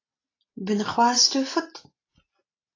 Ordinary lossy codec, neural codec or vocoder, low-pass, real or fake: AAC, 32 kbps; none; 7.2 kHz; real